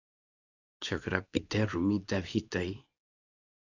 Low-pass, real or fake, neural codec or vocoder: 7.2 kHz; fake; codec, 24 kHz, 0.9 kbps, WavTokenizer, medium speech release version 2